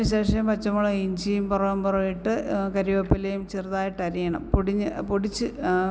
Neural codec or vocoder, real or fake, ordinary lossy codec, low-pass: none; real; none; none